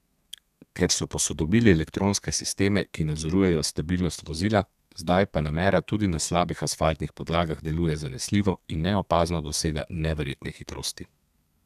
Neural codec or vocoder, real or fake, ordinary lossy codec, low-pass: codec, 32 kHz, 1.9 kbps, SNAC; fake; none; 14.4 kHz